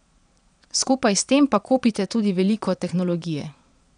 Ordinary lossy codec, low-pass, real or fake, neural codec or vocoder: none; 9.9 kHz; fake; vocoder, 22.05 kHz, 80 mel bands, Vocos